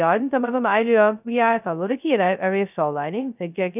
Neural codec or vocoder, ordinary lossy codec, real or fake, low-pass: codec, 16 kHz, 0.2 kbps, FocalCodec; none; fake; 3.6 kHz